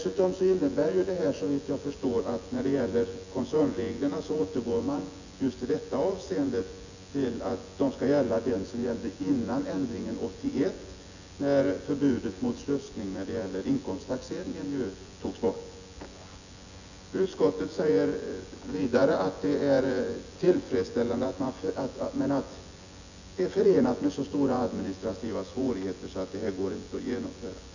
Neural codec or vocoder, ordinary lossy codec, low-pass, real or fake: vocoder, 24 kHz, 100 mel bands, Vocos; none; 7.2 kHz; fake